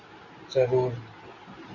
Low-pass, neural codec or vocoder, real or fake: 7.2 kHz; none; real